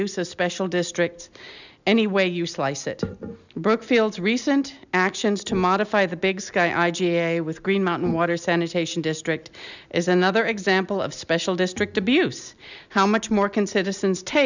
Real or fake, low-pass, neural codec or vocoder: real; 7.2 kHz; none